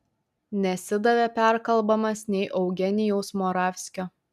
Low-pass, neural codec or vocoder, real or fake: 14.4 kHz; none; real